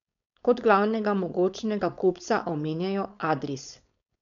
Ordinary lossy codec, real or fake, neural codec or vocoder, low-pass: none; fake; codec, 16 kHz, 4.8 kbps, FACodec; 7.2 kHz